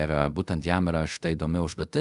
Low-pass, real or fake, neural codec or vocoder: 10.8 kHz; fake; codec, 24 kHz, 0.5 kbps, DualCodec